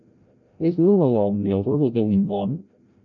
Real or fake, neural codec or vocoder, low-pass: fake; codec, 16 kHz, 0.5 kbps, FreqCodec, larger model; 7.2 kHz